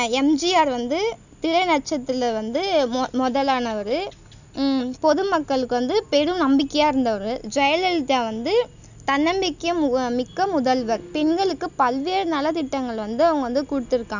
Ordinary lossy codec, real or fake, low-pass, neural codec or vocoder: none; real; 7.2 kHz; none